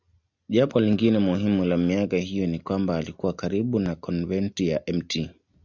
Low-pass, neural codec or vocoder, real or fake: 7.2 kHz; none; real